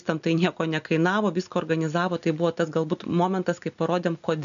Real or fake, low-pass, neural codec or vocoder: real; 7.2 kHz; none